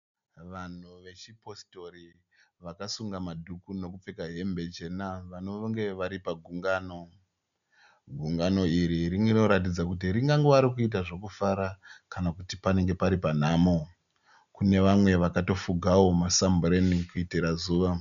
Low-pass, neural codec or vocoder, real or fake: 7.2 kHz; none; real